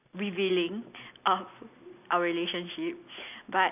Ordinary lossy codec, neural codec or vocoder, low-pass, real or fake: none; none; 3.6 kHz; real